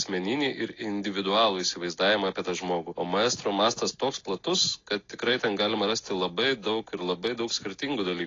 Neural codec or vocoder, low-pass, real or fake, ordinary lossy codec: none; 7.2 kHz; real; AAC, 32 kbps